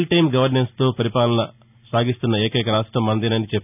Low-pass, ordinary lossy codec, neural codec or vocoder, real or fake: 3.6 kHz; none; none; real